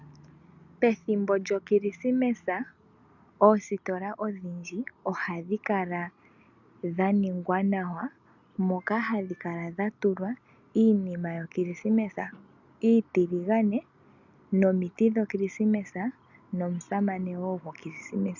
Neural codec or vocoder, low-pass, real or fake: none; 7.2 kHz; real